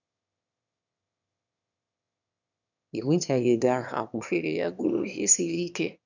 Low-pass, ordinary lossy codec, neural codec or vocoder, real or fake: 7.2 kHz; none; autoencoder, 22.05 kHz, a latent of 192 numbers a frame, VITS, trained on one speaker; fake